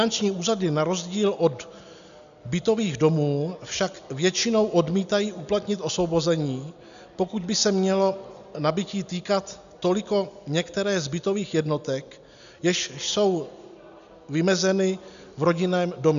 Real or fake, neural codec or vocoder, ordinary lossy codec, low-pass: real; none; MP3, 96 kbps; 7.2 kHz